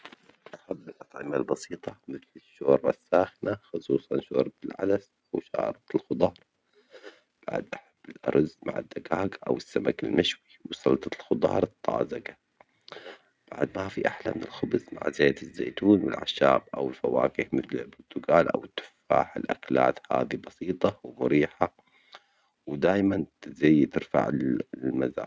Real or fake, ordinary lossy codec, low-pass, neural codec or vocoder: real; none; none; none